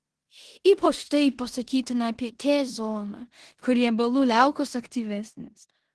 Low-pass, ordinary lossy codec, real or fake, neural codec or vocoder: 10.8 kHz; Opus, 16 kbps; fake; codec, 16 kHz in and 24 kHz out, 0.9 kbps, LongCat-Audio-Codec, four codebook decoder